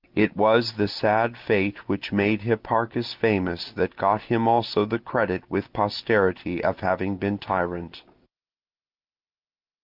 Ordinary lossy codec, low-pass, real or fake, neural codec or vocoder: Opus, 32 kbps; 5.4 kHz; real; none